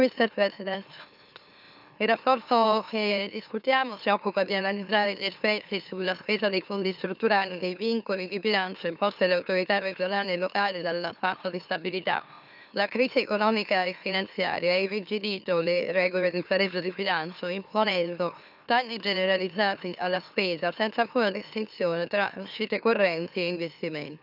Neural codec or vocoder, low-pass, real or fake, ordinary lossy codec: autoencoder, 44.1 kHz, a latent of 192 numbers a frame, MeloTTS; 5.4 kHz; fake; none